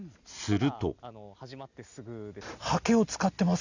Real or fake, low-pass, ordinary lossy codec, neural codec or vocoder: real; 7.2 kHz; none; none